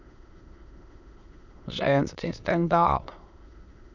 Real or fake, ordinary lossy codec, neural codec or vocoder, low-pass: fake; none; autoencoder, 22.05 kHz, a latent of 192 numbers a frame, VITS, trained on many speakers; 7.2 kHz